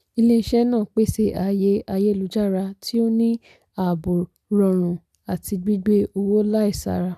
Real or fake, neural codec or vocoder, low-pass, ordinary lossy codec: real; none; 14.4 kHz; none